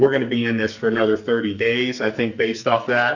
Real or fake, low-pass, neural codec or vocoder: fake; 7.2 kHz; codec, 44.1 kHz, 2.6 kbps, SNAC